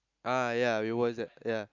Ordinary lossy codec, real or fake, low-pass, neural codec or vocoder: none; real; 7.2 kHz; none